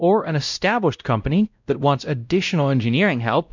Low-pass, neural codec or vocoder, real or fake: 7.2 kHz; codec, 16 kHz, 0.5 kbps, X-Codec, WavLM features, trained on Multilingual LibriSpeech; fake